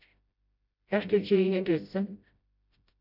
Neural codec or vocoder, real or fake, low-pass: codec, 16 kHz, 0.5 kbps, FreqCodec, smaller model; fake; 5.4 kHz